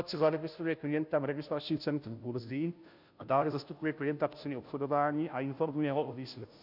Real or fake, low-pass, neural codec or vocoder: fake; 5.4 kHz; codec, 16 kHz, 0.5 kbps, FunCodec, trained on Chinese and English, 25 frames a second